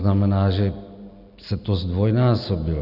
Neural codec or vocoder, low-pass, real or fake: none; 5.4 kHz; real